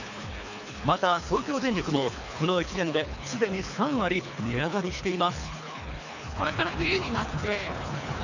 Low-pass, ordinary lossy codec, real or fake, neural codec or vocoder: 7.2 kHz; none; fake; codec, 24 kHz, 3 kbps, HILCodec